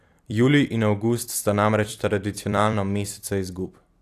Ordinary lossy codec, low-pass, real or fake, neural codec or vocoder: AAC, 64 kbps; 14.4 kHz; fake; vocoder, 44.1 kHz, 128 mel bands every 256 samples, BigVGAN v2